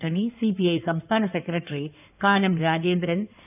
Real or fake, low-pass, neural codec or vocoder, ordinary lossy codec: fake; 3.6 kHz; codec, 16 kHz in and 24 kHz out, 2.2 kbps, FireRedTTS-2 codec; none